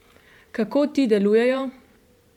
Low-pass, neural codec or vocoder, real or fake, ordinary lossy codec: 19.8 kHz; vocoder, 44.1 kHz, 128 mel bands every 512 samples, BigVGAN v2; fake; MP3, 96 kbps